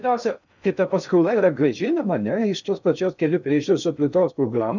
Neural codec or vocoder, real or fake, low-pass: codec, 16 kHz in and 24 kHz out, 0.6 kbps, FocalCodec, streaming, 2048 codes; fake; 7.2 kHz